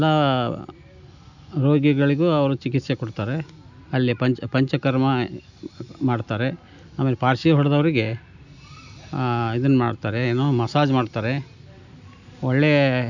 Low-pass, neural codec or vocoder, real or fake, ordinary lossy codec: 7.2 kHz; none; real; none